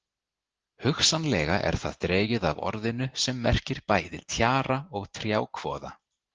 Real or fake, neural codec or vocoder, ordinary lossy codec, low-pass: real; none; Opus, 16 kbps; 7.2 kHz